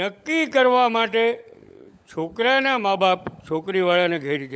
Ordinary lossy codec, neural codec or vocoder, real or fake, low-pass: none; codec, 16 kHz, 16 kbps, FunCodec, trained on LibriTTS, 50 frames a second; fake; none